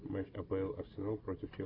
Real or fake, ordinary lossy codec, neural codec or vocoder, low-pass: real; AAC, 24 kbps; none; 5.4 kHz